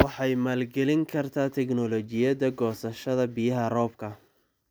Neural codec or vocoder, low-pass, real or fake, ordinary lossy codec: none; none; real; none